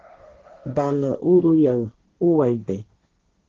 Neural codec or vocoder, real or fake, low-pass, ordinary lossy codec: codec, 16 kHz, 1.1 kbps, Voila-Tokenizer; fake; 7.2 kHz; Opus, 16 kbps